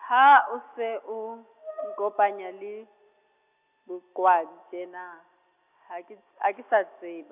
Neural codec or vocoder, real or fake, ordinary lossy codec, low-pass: none; real; none; 3.6 kHz